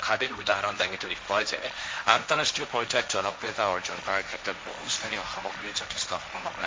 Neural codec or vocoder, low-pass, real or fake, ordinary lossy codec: codec, 16 kHz, 1.1 kbps, Voila-Tokenizer; none; fake; none